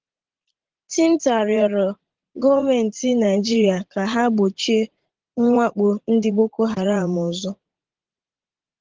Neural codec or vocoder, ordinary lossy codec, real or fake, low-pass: vocoder, 44.1 kHz, 80 mel bands, Vocos; Opus, 16 kbps; fake; 7.2 kHz